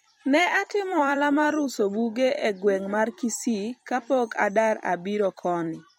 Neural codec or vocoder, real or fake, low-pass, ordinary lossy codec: vocoder, 44.1 kHz, 128 mel bands every 256 samples, BigVGAN v2; fake; 19.8 kHz; MP3, 64 kbps